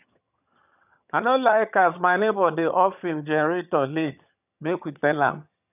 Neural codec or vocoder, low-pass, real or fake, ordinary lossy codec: vocoder, 22.05 kHz, 80 mel bands, HiFi-GAN; 3.6 kHz; fake; none